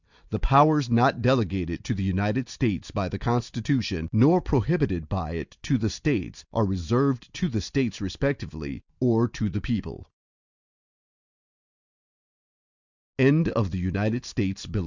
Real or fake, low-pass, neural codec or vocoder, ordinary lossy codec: real; 7.2 kHz; none; Opus, 64 kbps